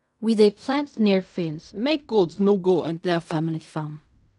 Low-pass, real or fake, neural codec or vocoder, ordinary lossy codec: 10.8 kHz; fake; codec, 16 kHz in and 24 kHz out, 0.4 kbps, LongCat-Audio-Codec, fine tuned four codebook decoder; none